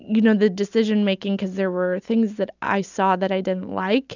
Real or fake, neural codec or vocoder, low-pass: real; none; 7.2 kHz